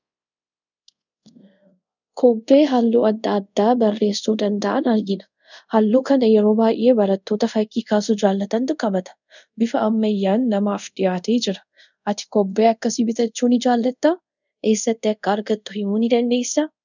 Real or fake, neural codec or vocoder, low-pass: fake; codec, 24 kHz, 0.5 kbps, DualCodec; 7.2 kHz